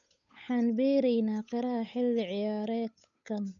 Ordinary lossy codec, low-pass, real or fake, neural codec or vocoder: none; 7.2 kHz; fake; codec, 16 kHz, 8 kbps, FunCodec, trained on Chinese and English, 25 frames a second